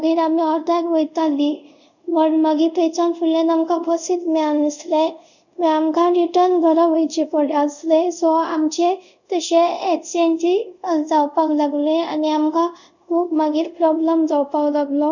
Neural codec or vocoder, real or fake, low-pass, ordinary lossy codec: codec, 24 kHz, 0.5 kbps, DualCodec; fake; 7.2 kHz; none